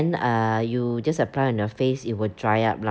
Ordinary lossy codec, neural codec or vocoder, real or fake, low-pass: none; none; real; none